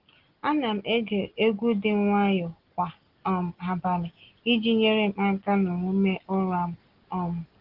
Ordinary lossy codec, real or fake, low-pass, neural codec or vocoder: Opus, 16 kbps; real; 5.4 kHz; none